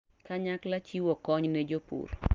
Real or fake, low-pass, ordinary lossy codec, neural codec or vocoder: real; 7.2 kHz; Opus, 24 kbps; none